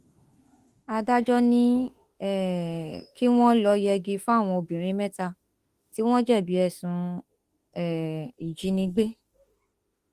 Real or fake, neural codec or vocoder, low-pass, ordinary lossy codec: fake; autoencoder, 48 kHz, 32 numbers a frame, DAC-VAE, trained on Japanese speech; 14.4 kHz; Opus, 24 kbps